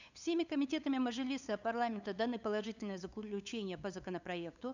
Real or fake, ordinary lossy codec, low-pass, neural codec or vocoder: fake; none; 7.2 kHz; codec, 16 kHz, 8 kbps, FunCodec, trained on LibriTTS, 25 frames a second